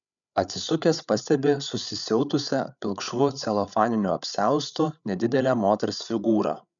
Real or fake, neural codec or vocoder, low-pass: fake; codec, 16 kHz, 8 kbps, FreqCodec, larger model; 7.2 kHz